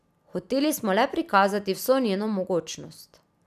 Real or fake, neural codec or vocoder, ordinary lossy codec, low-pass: real; none; none; 14.4 kHz